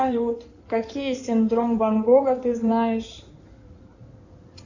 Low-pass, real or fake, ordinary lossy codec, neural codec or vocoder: 7.2 kHz; fake; Opus, 64 kbps; codec, 16 kHz in and 24 kHz out, 2.2 kbps, FireRedTTS-2 codec